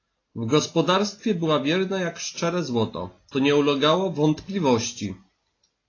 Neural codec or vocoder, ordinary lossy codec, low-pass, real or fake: none; AAC, 32 kbps; 7.2 kHz; real